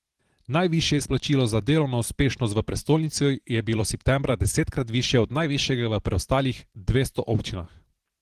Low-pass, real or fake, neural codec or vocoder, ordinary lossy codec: 14.4 kHz; fake; vocoder, 44.1 kHz, 128 mel bands every 512 samples, BigVGAN v2; Opus, 16 kbps